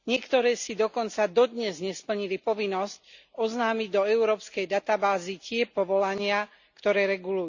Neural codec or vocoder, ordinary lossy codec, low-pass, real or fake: none; Opus, 64 kbps; 7.2 kHz; real